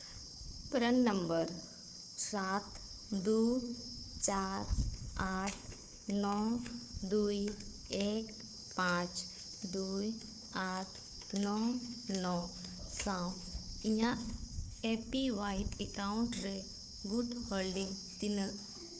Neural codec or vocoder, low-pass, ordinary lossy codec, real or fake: codec, 16 kHz, 4 kbps, FunCodec, trained on Chinese and English, 50 frames a second; none; none; fake